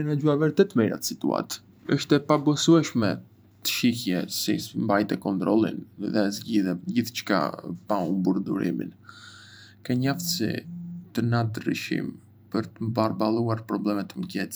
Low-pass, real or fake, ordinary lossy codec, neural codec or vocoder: none; fake; none; vocoder, 44.1 kHz, 128 mel bands every 512 samples, BigVGAN v2